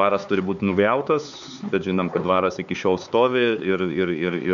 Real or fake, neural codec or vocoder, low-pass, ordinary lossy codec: fake; codec, 16 kHz, 4 kbps, X-Codec, HuBERT features, trained on LibriSpeech; 7.2 kHz; MP3, 96 kbps